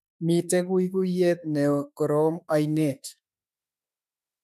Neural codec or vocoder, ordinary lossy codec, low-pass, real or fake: autoencoder, 48 kHz, 32 numbers a frame, DAC-VAE, trained on Japanese speech; none; 14.4 kHz; fake